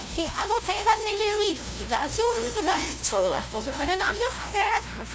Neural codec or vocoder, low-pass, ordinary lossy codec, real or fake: codec, 16 kHz, 0.5 kbps, FunCodec, trained on LibriTTS, 25 frames a second; none; none; fake